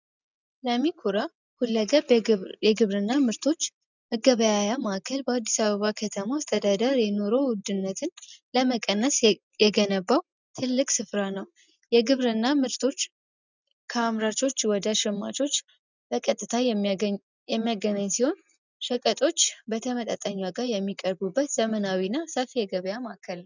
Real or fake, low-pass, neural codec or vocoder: real; 7.2 kHz; none